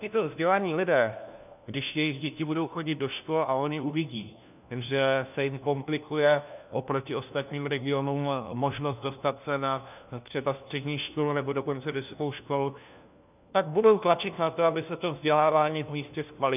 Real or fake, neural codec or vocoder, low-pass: fake; codec, 16 kHz, 1 kbps, FunCodec, trained on LibriTTS, 50 frames a second; 3.6 kHz